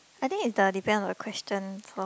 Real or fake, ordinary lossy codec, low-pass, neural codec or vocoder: real; none; none; none